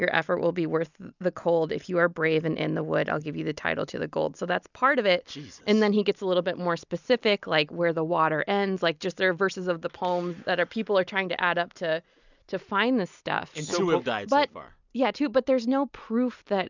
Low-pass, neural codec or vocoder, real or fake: 7.2 kHz; none; real